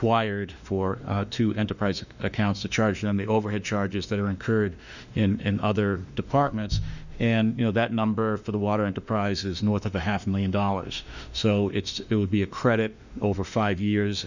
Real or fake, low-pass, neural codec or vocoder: fake; 7.2 kHz; autoencoder, 48 kHz, 32 numbers a frame, DAC-VAE, trained on Japanese speech